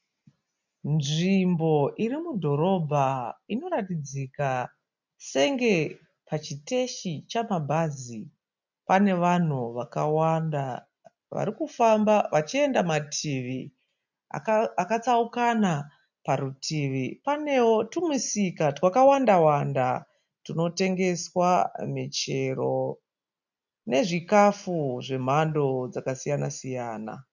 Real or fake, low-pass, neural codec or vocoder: real; 7.2 kHz; none